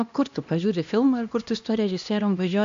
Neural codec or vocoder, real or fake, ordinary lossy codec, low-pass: codec, 16 kHz, 1 kbps, X-Codec, HuBERT features, trained on LibriSpeech; fake; AAC, 64 kbps; 7.2 kHz